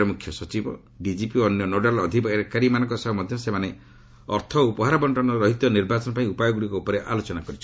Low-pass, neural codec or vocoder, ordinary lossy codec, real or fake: none; none; none; real